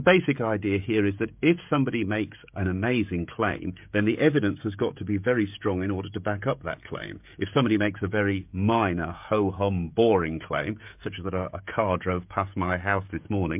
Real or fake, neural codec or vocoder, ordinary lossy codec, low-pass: fake; codec, 16 kHz, 16 kbps, FreqCodec, smaller model; MP3, 32 kbps; 3.6 kHz